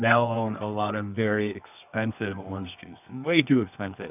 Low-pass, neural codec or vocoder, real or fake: 3.6 kHz; codec, 24 kHz, 0.9 kbps, WavTokenizer, medium music audio release; fake